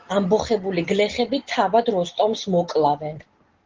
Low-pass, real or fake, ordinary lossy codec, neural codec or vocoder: 7.2 kHz; real; Opus, 16 kbps; none